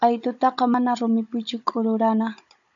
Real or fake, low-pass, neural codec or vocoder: fake; 7.2 kHz; codec, 16 kHz, 16 kbps, FunCodec, trained on Chinese and English, 50 frames a second